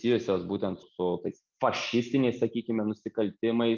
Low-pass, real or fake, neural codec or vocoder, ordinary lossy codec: 7.2 kHz; real; none; Opus, 24 kbps